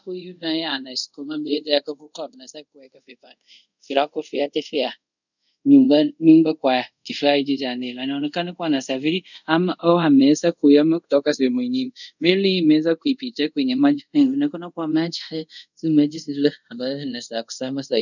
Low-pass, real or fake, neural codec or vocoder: 7.2 kHz; fake; codec, 24 kHz, 0.5 kbps, DualCodec